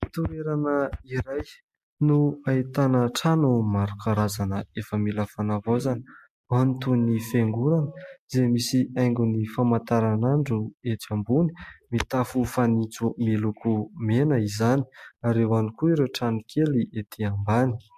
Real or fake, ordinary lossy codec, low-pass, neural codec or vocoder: real; MP3, 64 kbps; 14.4 kHz; none